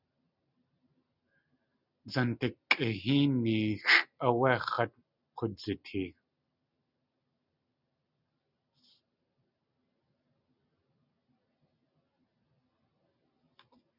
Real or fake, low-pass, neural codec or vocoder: real; 5.4 kHz; none